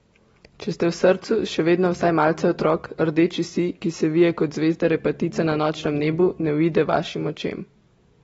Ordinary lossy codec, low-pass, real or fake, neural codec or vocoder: AAC, 24 kbps; 10.8 kHz; real; none